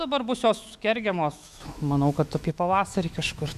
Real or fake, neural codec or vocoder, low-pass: fake; autoencoder, 48 kHz, 128 numbers a frame, DAC-VAE, trained on Japanese speech; 14.4 kHz